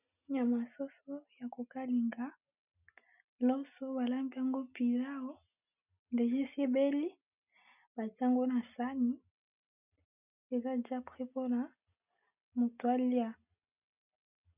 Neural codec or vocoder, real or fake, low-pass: none; real; 3.6 kHz